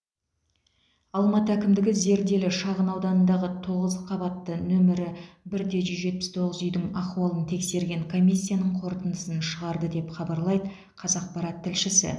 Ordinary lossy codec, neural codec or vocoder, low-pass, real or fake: none; none; none; real